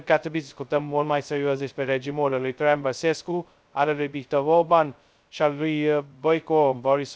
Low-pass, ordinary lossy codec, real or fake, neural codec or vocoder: none; none; fake; codec, 16 kHz, 0.2 kbps, FocalCodec